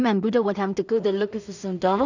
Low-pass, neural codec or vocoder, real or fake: 7.2 kHz; codec, 16 kHz in and 24 kHz out, 0.4 kbps, LongCat-Audio-Codec, two codebook decoder; fake